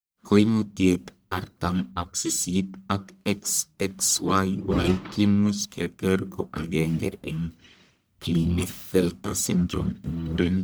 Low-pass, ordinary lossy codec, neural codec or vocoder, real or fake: none; none; codec, 44.1 kHz, 1.7 kbps, Pupu-Codec; fake